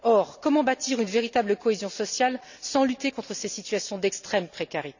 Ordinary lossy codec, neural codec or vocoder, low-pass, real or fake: none; none; 7.2 kHz; real